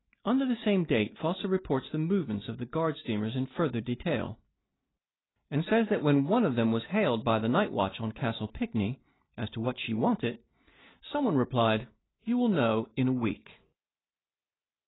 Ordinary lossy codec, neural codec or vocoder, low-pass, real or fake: AAC, 16 kbps; none; 7.2 kHz; real